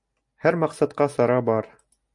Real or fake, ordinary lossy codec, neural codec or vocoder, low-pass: real; MP3, 96 kbps; none; 10.8 kHz